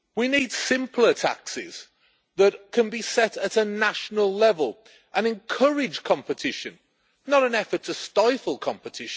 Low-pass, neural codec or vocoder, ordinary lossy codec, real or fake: none; none; none; real